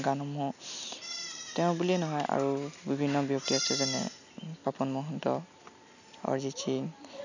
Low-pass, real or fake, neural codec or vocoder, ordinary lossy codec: 7.2 kHz; real; none; none